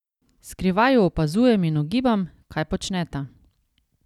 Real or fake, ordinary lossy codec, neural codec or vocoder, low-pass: real; none; none; 19.8 kHz